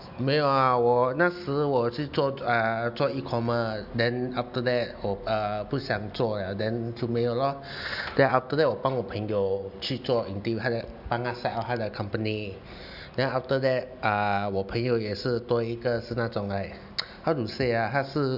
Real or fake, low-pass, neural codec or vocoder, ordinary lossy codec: real; 5.4 kHz; none; none